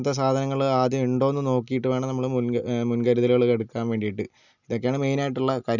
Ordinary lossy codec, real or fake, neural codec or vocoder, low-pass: none; real; none; 7.2 kHz